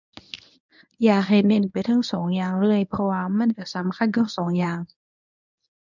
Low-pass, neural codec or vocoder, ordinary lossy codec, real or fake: 7.2 kHz; codec, 24 kHz, 0.9 kbps, WavTokenizer, medium speech release version 1; none; fake